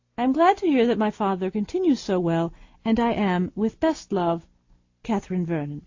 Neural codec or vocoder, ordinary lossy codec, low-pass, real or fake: none; AAC, 48 kbps; 7.2 kHz; real